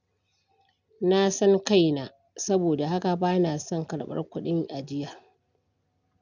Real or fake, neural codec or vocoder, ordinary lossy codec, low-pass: real; none; none; 7.2 kHz